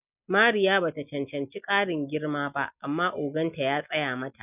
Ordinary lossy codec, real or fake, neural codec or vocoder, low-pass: none; real; none; 3.6 kHz